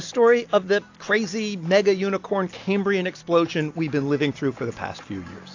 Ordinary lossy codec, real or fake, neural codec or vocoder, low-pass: AAC, 48 kbps; real; none; 7.2 kHz